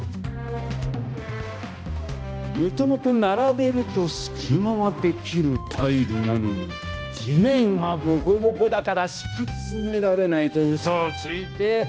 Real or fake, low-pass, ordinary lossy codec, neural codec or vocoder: fake; none; none; codec, 16 kHz, 1 kbps, X-Codec, HuBERT features, trained on balanced general audio